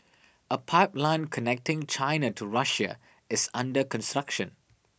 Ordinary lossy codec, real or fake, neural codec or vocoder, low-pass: none; real; none; none